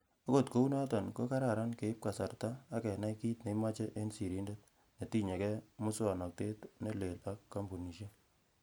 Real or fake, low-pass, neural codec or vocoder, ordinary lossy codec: real; none; none; none